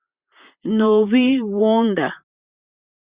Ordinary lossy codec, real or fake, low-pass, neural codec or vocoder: Opus, 64 kbps; fake; 3.6 kHz; vocoder, 44.1 kHz, 128 mel bands, Pupu-Vocoder